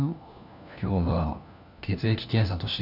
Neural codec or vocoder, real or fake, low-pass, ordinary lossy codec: codec, 16 kHz, 1 kbps, FunCodec, trained on LibriTTS, 50 frames a second; fake; 5.4 kHz; none